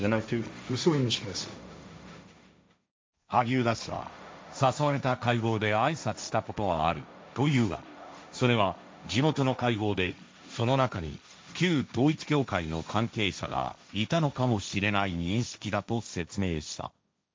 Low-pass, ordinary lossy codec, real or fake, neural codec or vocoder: none; none; fake; codec, 16 kHz, 1.1 kbps, Voila-Tokenizer